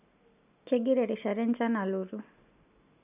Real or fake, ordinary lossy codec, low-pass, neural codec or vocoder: real; none; 3.6 kHz; none